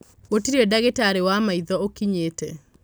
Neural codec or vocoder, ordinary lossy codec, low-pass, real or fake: none; none; none; real